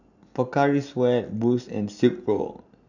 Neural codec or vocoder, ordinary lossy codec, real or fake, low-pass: none; none; real; 7.2 kHz